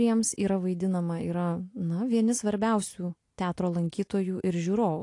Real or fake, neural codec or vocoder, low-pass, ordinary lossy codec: real; none; 10.8 kHz; AAC, 48 kbps